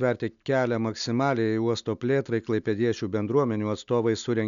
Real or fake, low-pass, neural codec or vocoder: real; 7.2 kHz; none